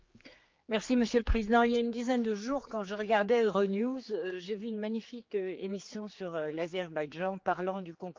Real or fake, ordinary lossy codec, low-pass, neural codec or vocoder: fake; Opus, 24 kbps; 7.2 kHz; codec, 16 kHz, 4 kbps, X-Codec, HuBERT features, trained on general audio